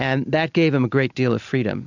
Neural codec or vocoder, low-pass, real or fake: none; 7.2 kHz; real